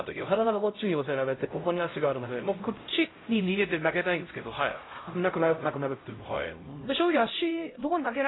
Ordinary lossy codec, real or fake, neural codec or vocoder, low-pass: AAC, 16 kbps; fake; codec, 16 kHz, 0.5 kbps, X-Codec, HuBERT features, trained on LibriSpeech; 7.2 kHz